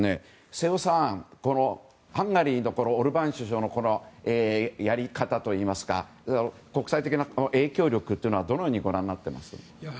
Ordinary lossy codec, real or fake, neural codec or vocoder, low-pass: none; real; none; none